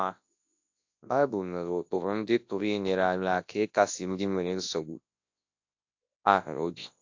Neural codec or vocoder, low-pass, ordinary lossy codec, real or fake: codec, 24 kHz, 0.9 kbps, WavTokenizer, large speech release; 7.2 kHz; AAC, 48 kbps; fake